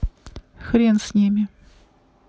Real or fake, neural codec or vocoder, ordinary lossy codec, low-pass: real; none; none; none